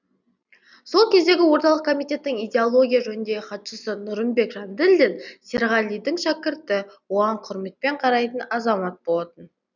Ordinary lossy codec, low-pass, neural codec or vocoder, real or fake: none; 7.2 kHz; none; real